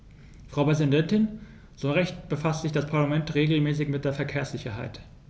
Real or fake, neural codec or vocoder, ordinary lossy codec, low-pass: real; none; none; none